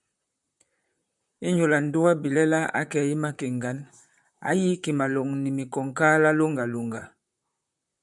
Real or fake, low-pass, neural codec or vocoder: fake; 10.8 kHz; vocoder, 44.1 kHz, 128 mel bands, Pupu-Vocoder